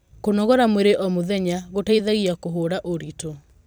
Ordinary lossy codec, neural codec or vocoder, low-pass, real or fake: none; vocoder, 44.1 kHz, 128 mel bands every 256 samples, BigVGAN v2; none; fake